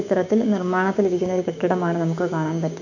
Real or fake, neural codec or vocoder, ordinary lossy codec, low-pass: fake; codec, 16 kHz, 6 kbps, DAC; none; 7.2 kHz